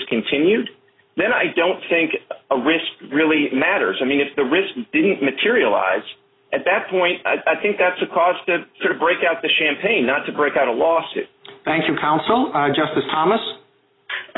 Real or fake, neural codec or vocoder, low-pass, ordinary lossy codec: real; none; 7.2 kHz; AAC, 16 kbps